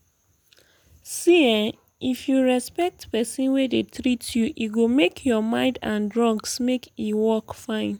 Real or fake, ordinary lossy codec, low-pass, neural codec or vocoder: real; none; none; none